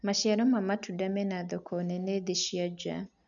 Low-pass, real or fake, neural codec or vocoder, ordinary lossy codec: 7.2 kHz; real; none; none